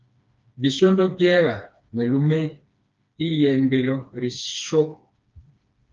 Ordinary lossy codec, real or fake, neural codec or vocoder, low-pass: Opus, 24 kbps; fake; codec, 16 kHz, 2 kbps, FreqCodec, smaller model; 7.2 kHz